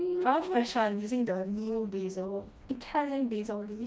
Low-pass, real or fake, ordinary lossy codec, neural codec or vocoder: none; fake; none; codec, 16 kHz, 1 kbps, FreqCodec, smaller model